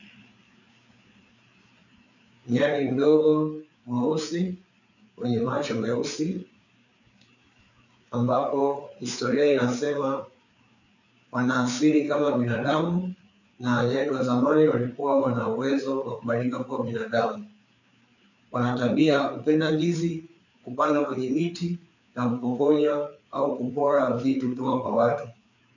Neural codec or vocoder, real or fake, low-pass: codec, 16 kHz, 4 kbps, FreqCodec, larger model; fake; 7.2 kHz